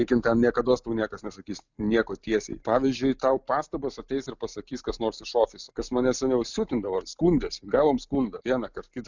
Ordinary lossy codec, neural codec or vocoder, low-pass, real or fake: Opus, 64 kbps; none; 7.2 kHz; real